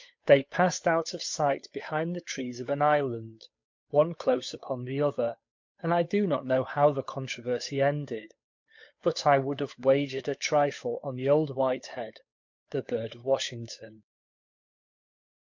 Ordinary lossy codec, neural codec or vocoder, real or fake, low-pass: MP3, 48 kbps; codec, 16 kHz, 8 kbps, FunCodec, trained on Chinese and English, 25 frames a second; fake; 7.2 kHz